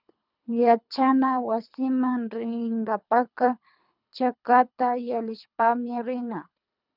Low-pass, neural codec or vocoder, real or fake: 5.4 kHz; codec, 24 kHz, 3 kbps, HILCodec; fake